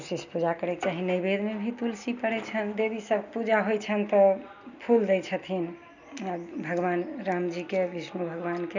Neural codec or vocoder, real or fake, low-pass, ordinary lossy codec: none; real; 7.2 kHz; none